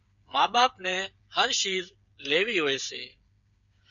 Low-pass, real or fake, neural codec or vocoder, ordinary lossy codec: 7.2 kHz; fake; codec, 16 kHz, 8 kbps, FreqCodec, smaller model; MP3, 96 kbps